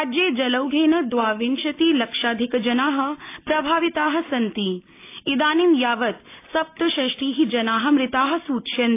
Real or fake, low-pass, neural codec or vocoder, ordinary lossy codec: real; 3.6 kHz; none; AAC, 24 kbps